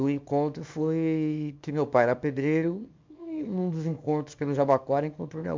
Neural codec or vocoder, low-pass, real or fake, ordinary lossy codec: codec, 24 kHz, 0.9 kbps, WavTokenizer, small release; 7.2 kHz; fake; MP3, 64 kbps